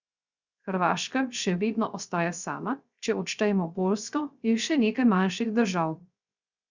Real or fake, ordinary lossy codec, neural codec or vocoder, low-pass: fake; Opus, 64 kbps; codec, 16 kHz, 0.3 kbps, FocalCodec; 7.2 kHz